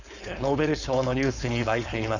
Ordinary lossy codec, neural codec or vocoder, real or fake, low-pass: none; codec, 16 kHz, 4.8 kbps, FACodec; fake; 7.2 kHz